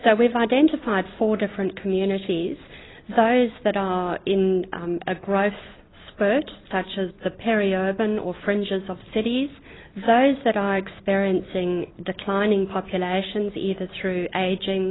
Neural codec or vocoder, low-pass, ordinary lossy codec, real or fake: none; 7.2 kHz; AAC, 16 kbps; real